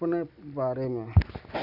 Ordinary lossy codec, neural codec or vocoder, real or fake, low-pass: none; none; real; 5.4 kHz